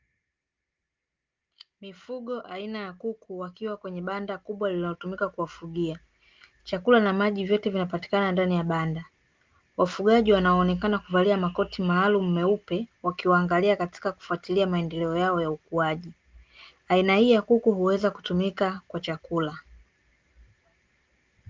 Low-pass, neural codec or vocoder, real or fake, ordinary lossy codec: 7.2 kHz; none; real; Opus, 32 kbps